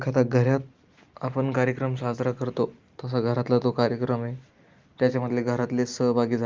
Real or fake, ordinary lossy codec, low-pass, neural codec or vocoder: real; Opus, 32 kbps; 7.2 kHz; none